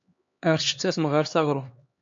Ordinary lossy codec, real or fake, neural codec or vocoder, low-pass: MP3, 48 kbps; fake; codec, 16 kHz, 2 kbps, X-Codec, HuBERT features, trained on LibriSpeech; 7.2 kHz